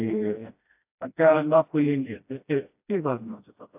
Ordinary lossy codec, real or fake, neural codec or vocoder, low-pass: AAC, 32 kbps; fake; codec, 16 kHz, 1 kbps, FreqCodec, smaller model; 3.6 kHz